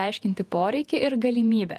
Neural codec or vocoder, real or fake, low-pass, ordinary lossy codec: vocoder, 48 kHz, 128 mel bands, Vocos; fake; 14.4 kHz; Opus, 32 kbps